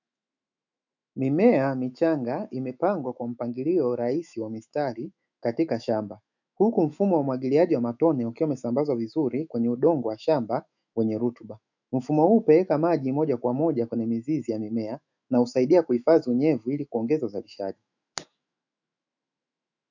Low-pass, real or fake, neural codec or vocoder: 7.2 kHz; fake; autoencoder, 48 kHz, 128 numbers a frame, DAC-VAE, trained on Japanese speech